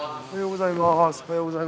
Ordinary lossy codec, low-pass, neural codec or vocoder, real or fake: none; none; none; real